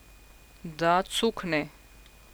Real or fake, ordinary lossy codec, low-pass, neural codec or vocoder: real; none; none; none